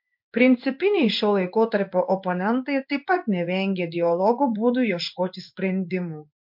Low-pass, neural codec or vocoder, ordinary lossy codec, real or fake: 5.4 kHz; codec, 16 kHz in and 24 kHz out, 1 kbps, XY-Tokenizer; MP3, 48 kbps; fake